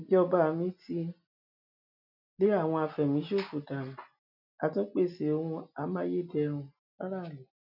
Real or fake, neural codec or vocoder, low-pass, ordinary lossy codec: real; none; 5.4 kHz; none